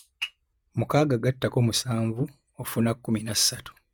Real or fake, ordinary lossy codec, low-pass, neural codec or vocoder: fake; none; none; vocoder, 48 kHz, 128 mel bands, Vocos